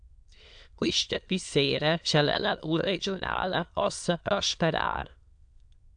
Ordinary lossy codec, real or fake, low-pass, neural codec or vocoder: AAC, 64 kbps; fake; 9.9 kHz; autoencoder, 22.05 kHz, a latent of 192 numbers a frame, VITS, trained on many speakers